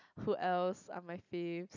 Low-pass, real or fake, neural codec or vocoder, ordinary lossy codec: 7.2 kHz; real; none; none